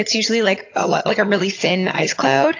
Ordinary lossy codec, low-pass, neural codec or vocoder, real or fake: AAC, 48 kbps; 7.2 kHz; vocoder, 22.05 kHz, 80 mel bands, HiFi-GAN; fake